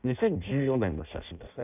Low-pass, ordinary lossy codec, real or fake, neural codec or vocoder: 3.6 kHz; none; fake; codec, 16 kHz in and 24 kHz out, 0.6 kbps, FireRedTTS-2 codec